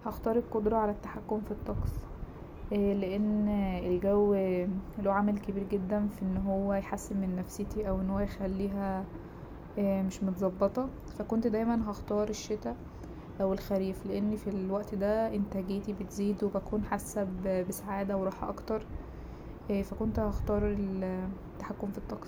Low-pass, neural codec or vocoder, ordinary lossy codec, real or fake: 19.8 kHz; none; none; real